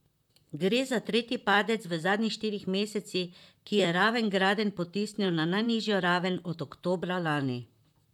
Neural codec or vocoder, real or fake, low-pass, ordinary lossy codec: vocoder, 44.1 kHz, 128 mel bands, Pupu-Vocoder; fake; 19.8 kHz; none